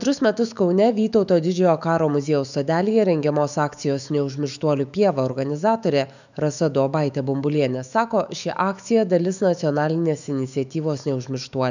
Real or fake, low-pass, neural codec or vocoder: fake; 7.2 kHz; autoencoder, 48 kHz, 128 numbers a frame, DAC-VAE, trained on Japanese speech